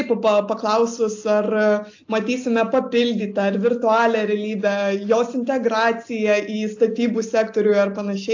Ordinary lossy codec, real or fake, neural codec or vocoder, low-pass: AAC, 48 kbps; real; none; 7.2 kHz